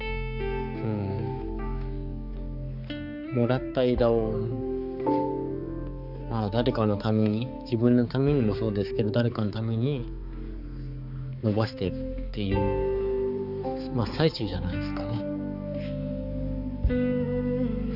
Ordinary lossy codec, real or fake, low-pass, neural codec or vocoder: none; fake; 5.4 kHz; codec, 16 kHz, 4 kbps, X-Codec, HuBERT features, trained on balanced general audio